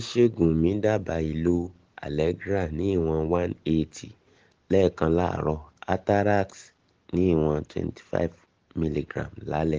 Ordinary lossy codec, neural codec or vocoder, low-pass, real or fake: Opus, 16 kbps; none; 7.2 kHz; real